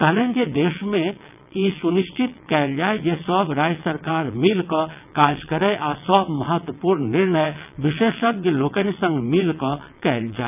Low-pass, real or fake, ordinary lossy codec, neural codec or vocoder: 3.6 kHz; fake; none; vocoder, 22.05 kHz, 80 mel bands, WaveNeXt